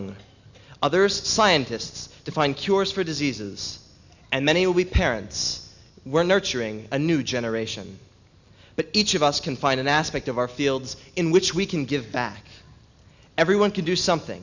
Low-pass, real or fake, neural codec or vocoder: 7.2 kHz; real; none